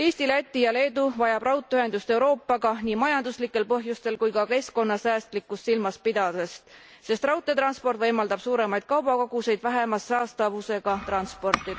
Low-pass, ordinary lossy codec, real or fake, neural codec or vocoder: none; none; real; none